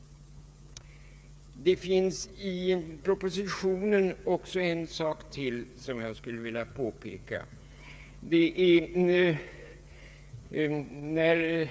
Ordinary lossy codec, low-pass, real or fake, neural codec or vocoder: none; none; fake; codec, 16 kHz, 8 kbps, FreqCodec, smaller model